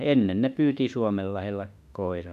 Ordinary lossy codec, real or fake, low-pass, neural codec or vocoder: none; fake; 14.4 kHz; autoencoder, 48 kHz, 32 numbers a frame, DAC-VAE, trained on Japanese speech